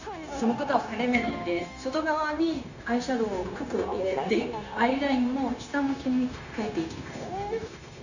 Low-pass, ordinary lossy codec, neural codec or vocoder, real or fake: 7.2 kHz; none; codec, 16 kHz, 0.9 kbps, LongCat-Audio-Codec; fake